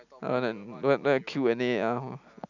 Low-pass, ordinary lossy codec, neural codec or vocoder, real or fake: 7.2 kHz; none; none; real